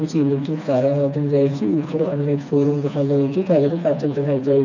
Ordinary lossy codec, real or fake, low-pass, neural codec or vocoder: none; fake; 7.2 kHz; codec, 16 kHz, 2 kbps, FreqCodec, smaller model